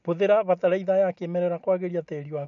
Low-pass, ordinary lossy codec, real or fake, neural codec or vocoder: 7.2 kHz; none; real; none